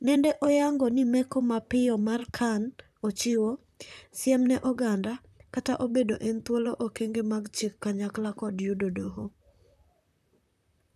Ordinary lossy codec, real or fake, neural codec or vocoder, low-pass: none; fake; vocoder, 44.1 kHz, 128 mel bands, Pupu-Vocoder; 14.4 kHz